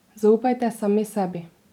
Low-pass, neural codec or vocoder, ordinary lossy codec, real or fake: 19.8 kHz; vocoder, 44.1 kHz, 128 mel bands every 512 samples, BigVGAN v2; none; fake